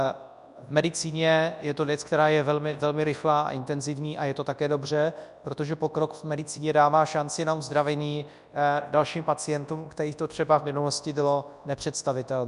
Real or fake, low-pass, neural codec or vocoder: fake; 10.8 kHz; codec, 24 kHz, 0.9 kbps, WavTokenizer, large speech release